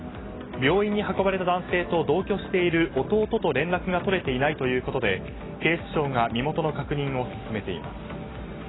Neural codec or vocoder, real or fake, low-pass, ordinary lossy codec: none; real; 7.2 kHz; AAC, 16 kbps